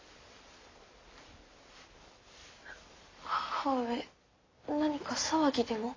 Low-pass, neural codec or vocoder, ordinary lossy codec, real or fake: 7.2 kHz; none; AAC, 32 kbps; real